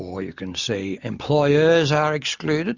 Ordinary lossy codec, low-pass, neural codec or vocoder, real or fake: Opus, 64 kbps; 7.2 kHz; none; real